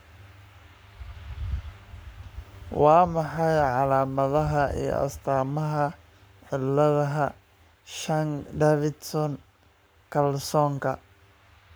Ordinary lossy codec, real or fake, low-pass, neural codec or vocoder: none; fake; none; codec, 44.1 kHz, 7.8 kbps, Pupu-Codec